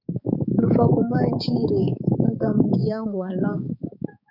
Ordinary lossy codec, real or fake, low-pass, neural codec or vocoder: MP3, 48 kbps; fake; 5.4 kHz; codec, 16 kHz, 4 kbps, X-Codec, HuBERT features, trained on balanced general audio